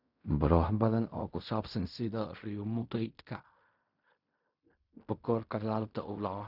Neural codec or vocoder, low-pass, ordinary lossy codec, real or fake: codec, 16 kHz in and 24 kHz out, 0.4 kbps, LongCat-Audio-Codec, fine tuned four codebook decoder; 5.4 kHz; none; fake